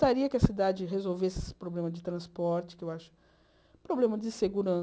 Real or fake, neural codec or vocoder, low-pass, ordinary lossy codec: real; none; none; none